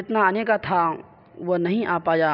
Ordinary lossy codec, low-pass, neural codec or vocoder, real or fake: none; 5.4 kHz; none; real